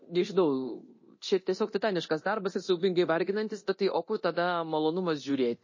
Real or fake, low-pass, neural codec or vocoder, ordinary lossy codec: fake; 7.2 kHz; codec, 24 kHz, 0.9 kbps, DualCodec; MP3, 32 kbps